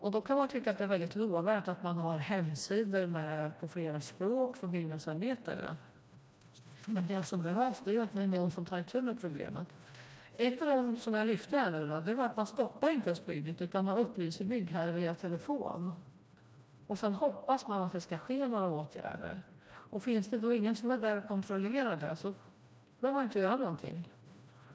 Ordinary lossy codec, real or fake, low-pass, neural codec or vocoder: none; fake; none; codec, 16 kHz, 1 kbps, FreqCodec, smaller model